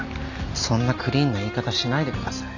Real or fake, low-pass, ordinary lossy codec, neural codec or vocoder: real; 7.2 kHz; none; none